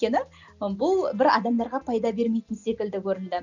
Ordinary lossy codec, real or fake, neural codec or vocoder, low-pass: none; real; none; 7.2 kHz